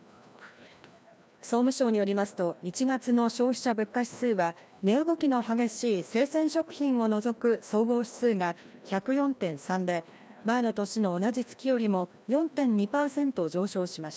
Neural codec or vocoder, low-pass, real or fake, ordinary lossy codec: codec, 16 kHz, 1 kbps, FreqCodec, larger model; none; fake; none